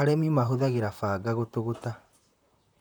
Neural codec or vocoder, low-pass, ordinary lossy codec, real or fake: vocoder, 44.1 kHz, 128 mel bands, Pupu-Vocoder; none; none; fake